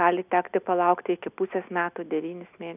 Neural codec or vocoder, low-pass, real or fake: none; 3.6 kHz; real